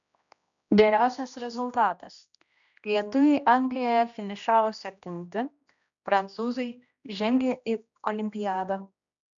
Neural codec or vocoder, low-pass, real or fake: codec, 16 kHz, 1 kbps, X-Codec, HuBERT features, trained on general audio; 7.2 kHz; fake